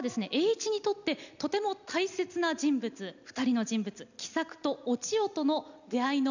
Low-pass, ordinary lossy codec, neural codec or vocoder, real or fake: 7.2 kHz; none; none; real